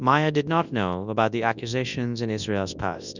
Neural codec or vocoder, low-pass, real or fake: codec, 24 kHz, 0.9 kbps, WavTokenizer, large speech release; 7.2 kHz; fake